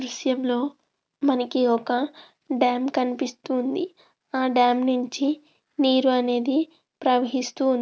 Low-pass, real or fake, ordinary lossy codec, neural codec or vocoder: none; real; none; none